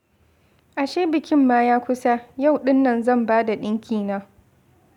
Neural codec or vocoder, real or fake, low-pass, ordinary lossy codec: none; real; 19.8 kHz; none